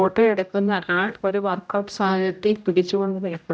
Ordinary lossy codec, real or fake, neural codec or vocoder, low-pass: none; fake; codec, 16 kHz, 0.5 kbps, X-Codec, HuBERT features, trained on general audio; none